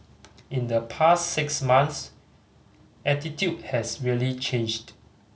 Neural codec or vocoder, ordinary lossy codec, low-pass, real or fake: none; none; none; real